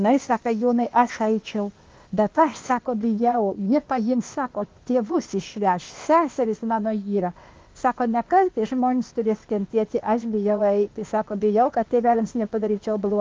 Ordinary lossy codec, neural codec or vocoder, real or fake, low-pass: Opus, 32 kbps; codec, 16 kHz, 0.8 kbps, ZipCodec; fake; 7.2 kHz